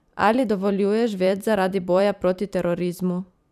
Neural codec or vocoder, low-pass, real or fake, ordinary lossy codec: vocoder, 44.1 kHz, 128 mel bands every 512 samples, BigVGAN v2; 14.4 kHz; fake; none